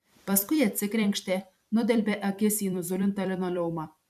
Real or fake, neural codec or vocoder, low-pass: fake; vocoder, 44.1 kHz, 128 mel bands every 256 samples, BigVGAN v2; 14.4 kHz